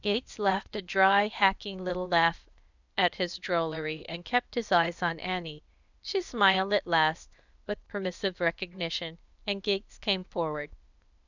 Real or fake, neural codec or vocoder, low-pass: fake; codec, 16 kHz, 0.8 kbps, ZipCodec; 7.2 kHz